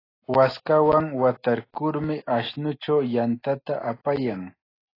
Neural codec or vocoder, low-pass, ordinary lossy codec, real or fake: none; 5.4 kHz; AAC, 24 kbps; real